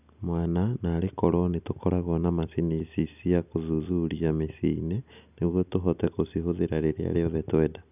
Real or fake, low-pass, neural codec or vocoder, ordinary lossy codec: real; 3.6 kHz; none; none